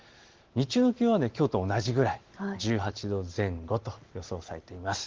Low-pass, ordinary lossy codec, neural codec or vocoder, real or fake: 7.2 kHz; Opus, 16 kbps; none; real